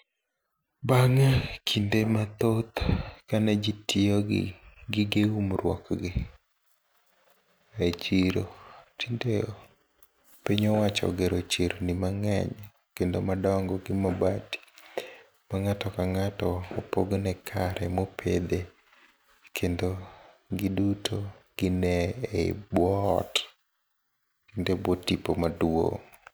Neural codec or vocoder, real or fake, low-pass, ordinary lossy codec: none; real; none; none